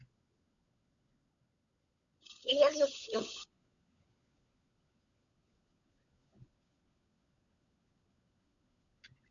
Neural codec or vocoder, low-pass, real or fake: codec, 16 kHz, 16 kbps, FunCodec, trained on LibriTTS, 50 frames a second; 7.2 kHz; fake